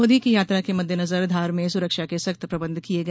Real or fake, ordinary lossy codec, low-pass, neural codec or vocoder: real; none; none; none